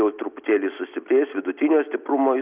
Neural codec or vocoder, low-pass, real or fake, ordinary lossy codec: none; 3.6 kHz; real; Opus, 64 kbps